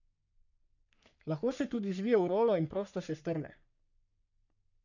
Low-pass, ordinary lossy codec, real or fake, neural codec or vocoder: 7.2 kHz; none; fake; codec, 44.1 kHz, 3.4 kbps, Pupu-Codec